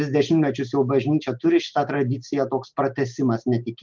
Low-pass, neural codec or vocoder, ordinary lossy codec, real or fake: 7.2 kHz; none; Opus, 32 kbps; real